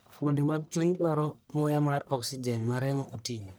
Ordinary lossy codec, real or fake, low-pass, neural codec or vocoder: none; fake; none; codec, 44.1 kHz, 1.7 kbps, Pupu-Codec